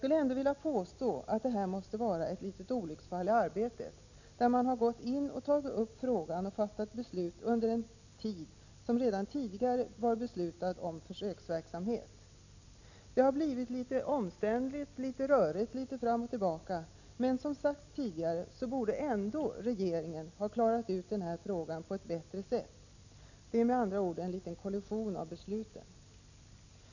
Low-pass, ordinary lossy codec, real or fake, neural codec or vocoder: 7.2 kHz; none; real; none